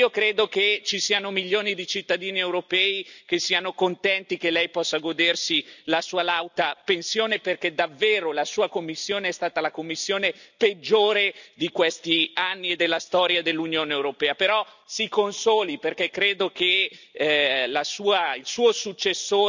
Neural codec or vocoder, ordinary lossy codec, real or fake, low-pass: none; none; real; 7.2 kHz